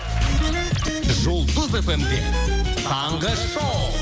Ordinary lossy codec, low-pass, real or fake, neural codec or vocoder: none; none; real; none